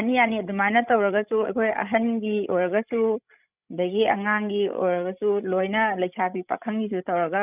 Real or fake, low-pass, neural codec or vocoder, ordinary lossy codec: fake; 3.6 kHz; codec, 16 kHz, 16 kbps, FreqCodec, larger model; none